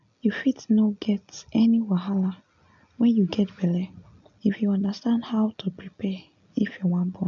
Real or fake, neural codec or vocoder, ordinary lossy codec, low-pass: real; none; MP3, 64 kbps; 7.2 kHz